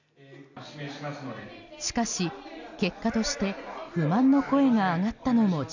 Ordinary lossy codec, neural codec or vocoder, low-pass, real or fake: none; none; 7.2 kHz; real